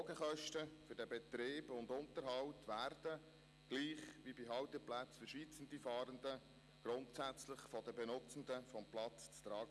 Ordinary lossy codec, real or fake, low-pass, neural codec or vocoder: none; real; none; none